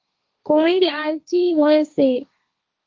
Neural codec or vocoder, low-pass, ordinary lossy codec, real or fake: codec, 16 kHz, 1.1 kbps, Voila-Tokenizer; 7.2 kHz; Opus, 24 kbps; fake